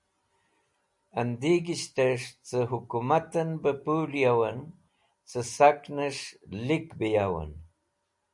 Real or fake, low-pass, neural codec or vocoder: real; 10.8 kHz; none